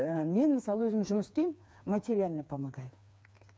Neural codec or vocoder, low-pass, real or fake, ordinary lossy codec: codec, 16 kHz, 4 kbps, FreqCodec, smaller model; none; fake; none